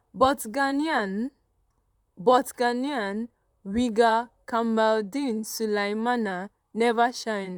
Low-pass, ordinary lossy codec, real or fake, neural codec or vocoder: 19.8 kHz; none; fake; vocoder, 44.1 kHz, 128 mel bands, Pupu-Vocoder